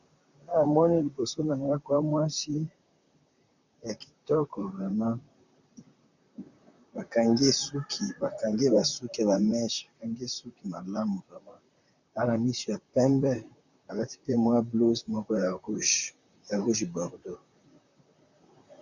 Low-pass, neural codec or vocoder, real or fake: 7.2 kHz; vocoder, 44.1 kHz, 128 mel bands, Pupu-Vocoder; fake